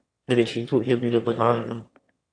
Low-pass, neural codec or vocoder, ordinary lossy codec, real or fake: 9.9 kHz; autoencoder, 22.05 kHz, a latent of 192 numbers a frame, VITS, trained on one speaker; AAC, 48 kbps; fake